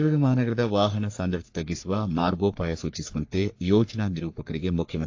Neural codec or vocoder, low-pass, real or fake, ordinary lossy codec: codec, 44.1 kHz, 3.4 kbps, Pupu-Codec; 7.2 kHz; fake; AAC, 48 kbps